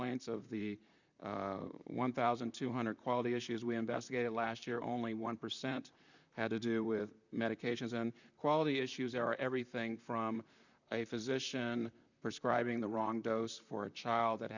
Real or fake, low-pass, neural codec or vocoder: fake; 7.2 kHz; vocoder, 44.1 kHz, 128 mel bands, Pupu-Vocoder